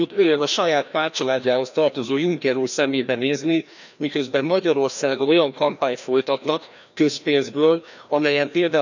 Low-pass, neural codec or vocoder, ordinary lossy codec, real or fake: 7.2 kHz; codec, 16 kHz, 1 kbps, FreqCodec, larger model; none; fake